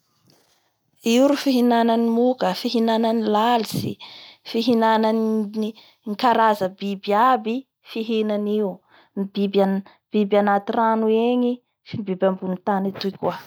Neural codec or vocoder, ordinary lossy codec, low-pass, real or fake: none; none; none; real